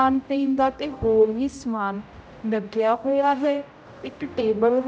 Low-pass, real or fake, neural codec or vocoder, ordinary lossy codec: none; fake; codec, 16 kHz, 0.5 kbps, X-Codec, HuBERT features, trained on general audio; none